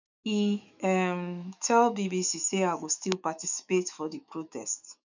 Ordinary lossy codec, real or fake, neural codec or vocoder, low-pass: none; fake; autoencoder, 48 kHz, 128 numbers a frame, DAC-VAE, trained on Japanese speech; 7.2 kHz